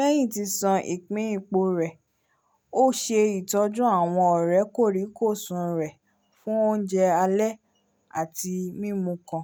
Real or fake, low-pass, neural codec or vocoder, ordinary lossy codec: real; none; none; none